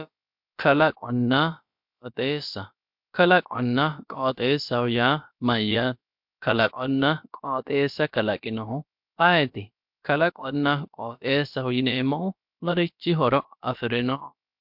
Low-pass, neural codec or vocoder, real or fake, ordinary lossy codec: 5.4 kHz; codec, 16 kHz, about 1 kbps, DyCAST, with the encoder's durations; fake; MP3, 48 kbps